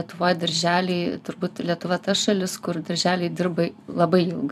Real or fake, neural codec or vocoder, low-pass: fake; vocoder, 44.1 kHz, 128 mel bands every 256 samples, BigVGAN v2; 14.4 kHz